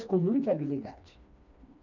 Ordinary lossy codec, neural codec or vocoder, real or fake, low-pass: none; codec, 16 kHz, 2 kbps, FreqCodec, smaller model; fake; 7.2 kHz